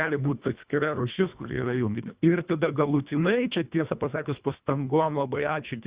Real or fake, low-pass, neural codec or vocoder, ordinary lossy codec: fake; 3.6 kHz; codec, 24 kHz, 1.5 kbps, HILCodec; Opus, 64 kbps